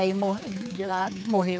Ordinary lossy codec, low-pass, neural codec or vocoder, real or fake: none; none; codec, 16 kHz, 4 kbps, X-Codec, HuBERT features, trained on general audio; fake